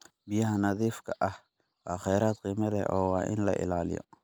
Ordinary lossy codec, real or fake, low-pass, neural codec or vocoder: none; real; none; none